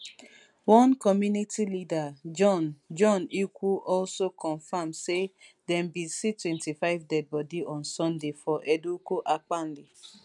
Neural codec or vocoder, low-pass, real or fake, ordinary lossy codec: none; 10.8 kHz; real; none